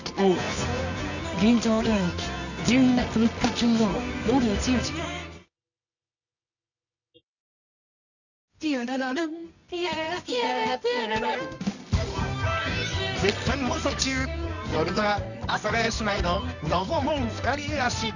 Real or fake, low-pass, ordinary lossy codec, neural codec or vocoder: fake; 7.2 kHz; none; codec, 24 kHz, 0.9 kbps, WavTokenizer, medium music audio release